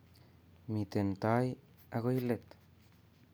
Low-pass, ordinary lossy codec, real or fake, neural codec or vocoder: none; none; real; none